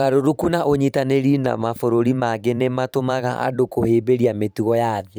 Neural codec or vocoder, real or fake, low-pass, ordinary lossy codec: vocoder, 44.1 kHz, 128 mel bands every 512 samples, BigVGAN v2; fake; none; none